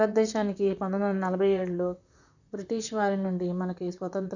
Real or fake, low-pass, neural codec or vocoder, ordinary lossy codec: fake; 7.2 kHz; vocoder, 44.1 kHz, 128 mel bands, Pupu-Vocoder; none